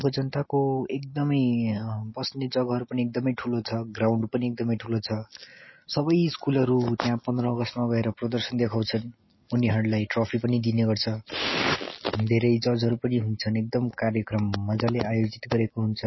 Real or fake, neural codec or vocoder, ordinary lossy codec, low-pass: real; none; MP3, 24 kbps; 7.2 kHz